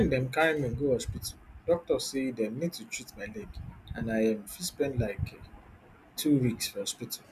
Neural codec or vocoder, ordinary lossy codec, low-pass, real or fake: none; none; 14.4 kHz; real